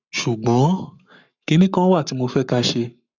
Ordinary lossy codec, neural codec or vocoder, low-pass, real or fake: none; codec, 44.1 kHz, 7.8 kbps, Pupu-Codec; 7.2 kHz; fake